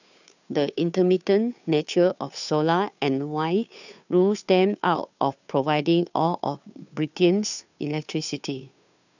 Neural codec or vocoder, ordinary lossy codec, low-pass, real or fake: codec, 16 kHz, 2 kbps, FunCodec, trained on Chinese and English, 25 frames a second; none; 7.2 kHz; fake